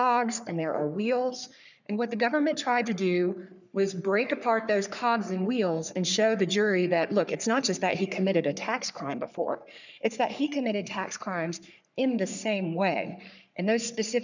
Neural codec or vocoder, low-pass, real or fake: codec, 44.1 kHz, 3.4 kbps, Pupu-Codec; 7.2 kHz; fake